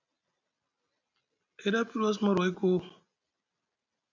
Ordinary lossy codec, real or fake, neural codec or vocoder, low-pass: MP3, 64 kbps; real; none; 7.2 kHz